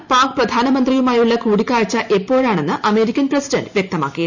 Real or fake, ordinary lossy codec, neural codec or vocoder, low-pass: real; none; none; 7.2 kHz